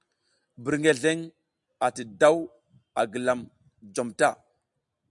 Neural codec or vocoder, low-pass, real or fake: none; 10.8 kHz; real